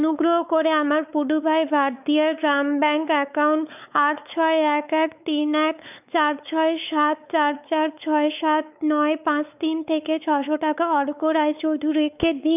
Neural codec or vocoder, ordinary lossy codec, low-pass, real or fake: codec, 16 kHz, 4 kbps, X-Codec, WavLM features, trained on Multilingual LibriSpeech; none; 3.6 kHz; fake